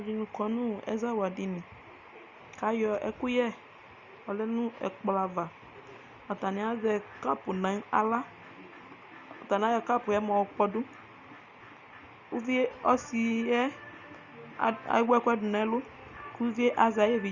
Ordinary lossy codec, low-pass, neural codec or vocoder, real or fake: Opus, 64 kbps; 7.2 kHz; none; real